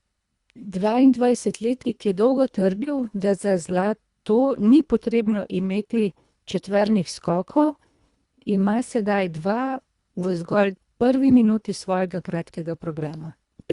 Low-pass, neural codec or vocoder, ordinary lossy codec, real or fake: 10.8 kHz; codec, 24 kHz, 1.5 kbps, HILCodec; Opus, 64 kbps; fake